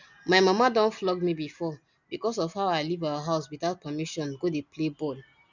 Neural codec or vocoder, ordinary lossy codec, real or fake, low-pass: none; none; real; 7.2 kHz